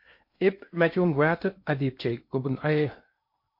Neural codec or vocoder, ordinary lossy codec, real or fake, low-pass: codec, 16 kHz in and 24 kHz out, 0.8 kbps, FocalCodec, streaming, 65536 codes; MP3, 32 kbps; fake; 5.4 kHz